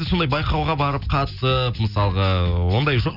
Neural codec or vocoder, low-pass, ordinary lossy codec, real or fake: none; 5.4 kHz; none; real